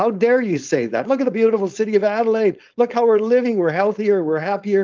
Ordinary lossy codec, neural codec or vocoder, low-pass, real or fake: Opus, 24 kbps; codec, 16 kHz, 4.8 kbps, FACodec; 7.2 kHz; fake